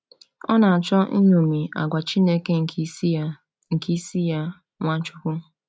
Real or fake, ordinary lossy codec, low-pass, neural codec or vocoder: real; none; none; none